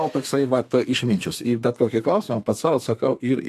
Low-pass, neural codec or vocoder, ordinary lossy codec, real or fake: 14.4 kHz; codec, 32 kHz, 1.9 kbps, SNAC; AAC, 64 kbps; fake